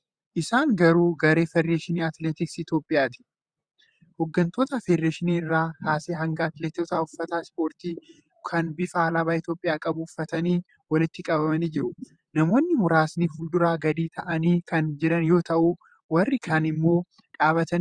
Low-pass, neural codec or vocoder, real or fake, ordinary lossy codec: 9.9 kHz; vocoder, 44.1 kHz, 128 mel bands, Pupu-Vocoder; fake; Opus, 64 kbps